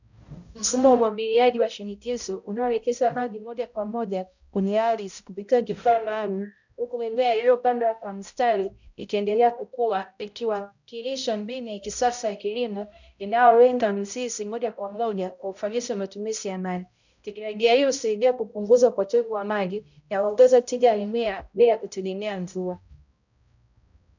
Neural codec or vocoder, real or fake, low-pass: codec, 16 kHz, 0.5 kbps, X-Codec, HuBERT features, trained on balanced general audio; fake; 7.2 kHz